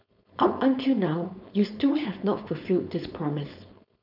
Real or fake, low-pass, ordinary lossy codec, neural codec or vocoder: fake; 5.4 kHz; none; codec, 16 kHz, 4.8 kbps, FACodec